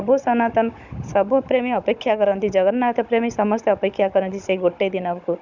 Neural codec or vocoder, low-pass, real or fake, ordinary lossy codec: codec, 16 kHz, 16 kbps, FunCodec, trained on Chinese and English, 50 frames a second; 7.2 kHz; fake; none